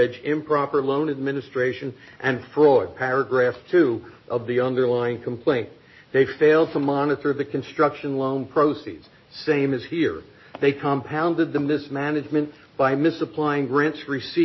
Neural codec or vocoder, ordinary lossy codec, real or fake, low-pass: none; MP3, 24 kbps; real; 7.2 kHz